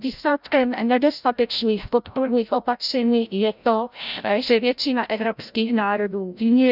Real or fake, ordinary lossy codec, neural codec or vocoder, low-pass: fake; none; codec, 16 kHz, 0.5 kbps, FreqCodec, larger model; 5.4 kHz